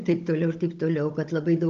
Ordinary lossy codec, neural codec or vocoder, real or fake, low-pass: Opus, 32 kbps; codec, 16 kHz, 16 kbps, FunCodec, trained on Chinese and English, 50 frames a second; fake; 7.2 kHz